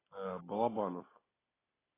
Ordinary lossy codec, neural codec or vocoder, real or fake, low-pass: AAC, 16 kbps; none; real; 3.6 kHz